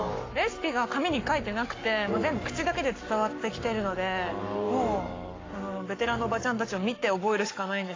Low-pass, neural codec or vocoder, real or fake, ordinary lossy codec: 7.2 kHz; codec, 44.1 kHz, 7.8 kbps, Pupu-Codec; fake; AAC, 32 kbps